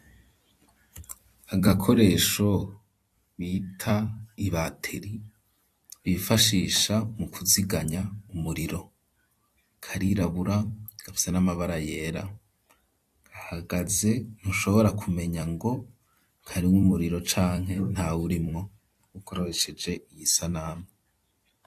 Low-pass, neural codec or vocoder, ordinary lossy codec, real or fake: 14.4 kHz; vocoder, 44.1 kHz, 128 mel bands every 256 samples, BigVGAN v2; AAC, 64 kbps; fake